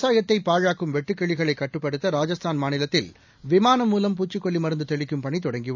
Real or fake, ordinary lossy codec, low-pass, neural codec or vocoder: real; none; 7.2 kHz; none